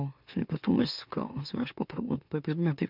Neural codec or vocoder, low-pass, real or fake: autoencoder, 44.1 kHz, a latent of 192 numbers a frame, MeloTTS; 5.4 kHz; fake